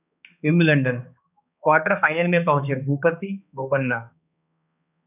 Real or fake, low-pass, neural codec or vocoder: fake; 3.6 kHz; codec, 16 kHz, 2 kbps, X-Codec, HuBERT features, trained on balanced general audio